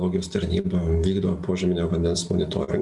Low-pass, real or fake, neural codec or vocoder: 10.8 kHz; real; none